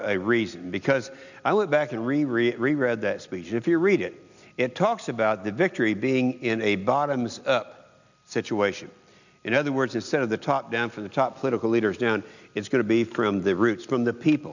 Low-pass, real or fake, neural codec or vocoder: 7.2 kHz; real; none